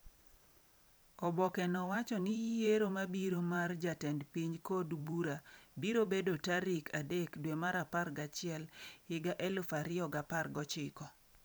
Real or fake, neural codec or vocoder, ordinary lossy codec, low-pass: fake; vocoder, 44.1 kHz, 128 mel bands every 512 samples, BigVGAN v2; none; none